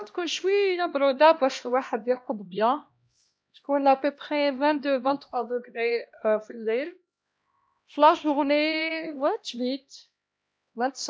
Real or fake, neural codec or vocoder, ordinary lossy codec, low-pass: fake; codec, 16 kHz, 1 kbps, X-Codec, HuBERT features, trained on LibriSpeech; none; none